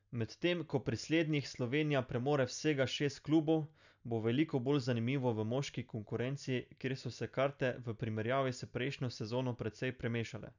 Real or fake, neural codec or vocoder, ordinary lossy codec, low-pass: real; none; none; 7.2 kHz